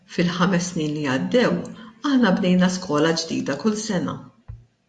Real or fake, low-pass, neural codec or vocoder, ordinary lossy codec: real; 10.8 kHz; none; AAC, 64 kbps